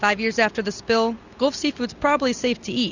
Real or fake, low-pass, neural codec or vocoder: real; 7.2 kHz; none